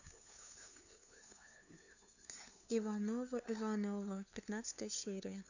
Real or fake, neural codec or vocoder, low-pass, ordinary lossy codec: fake; codec, 16 kHz, 2 kbps, FunCodec, trained on LibriTTS, 25 frames a second; 7.2 kHz; none